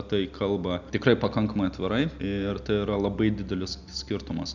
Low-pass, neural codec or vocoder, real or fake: 7.2 kHz; none; real